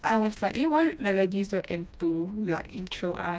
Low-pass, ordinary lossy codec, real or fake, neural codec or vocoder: none; none; fake; codec, 16 kHz, 1 kbps, FreqCodec, smaller model